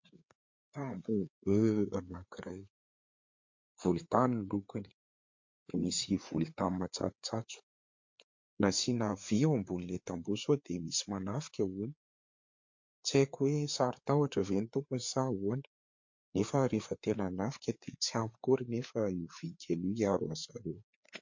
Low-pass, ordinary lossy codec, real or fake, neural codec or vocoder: 7.2 kHz; MP3, 48 kbps; fake; codec, 16 kHz, 4 kbps, FreqCodec, larger model